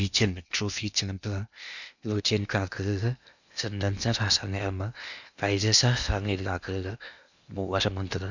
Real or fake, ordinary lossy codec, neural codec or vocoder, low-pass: fake; none; codec, 16 kHz in and 24 kHz out, 0.8 kbps, FocalCodec, streaming, 65536 codes; 7.2 kHz